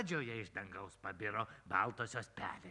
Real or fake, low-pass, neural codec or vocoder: real; 10.8 kHz; none